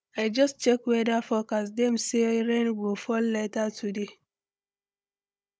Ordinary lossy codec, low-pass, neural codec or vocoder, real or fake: none; none; codec, 16 kHz, 16 kbps, FunCodec, trained on Chinese and English, 50 frames a second; fake